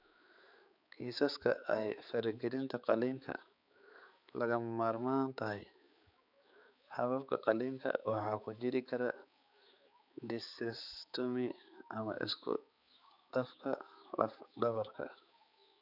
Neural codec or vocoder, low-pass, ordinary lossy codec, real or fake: codec, 16 kHz, 4 kbps, X-Codec, HuBERT features, trained on balanced general audio; 5.4 kHz; none; fake